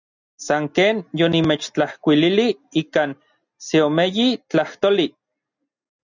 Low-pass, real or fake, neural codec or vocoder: 7.2 kHz; real; none